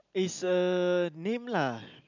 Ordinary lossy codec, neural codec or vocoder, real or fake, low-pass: none; none; real; 7.2 kHz